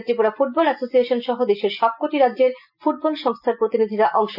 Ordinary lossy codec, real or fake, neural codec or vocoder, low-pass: none; real; none; 5.4 kHz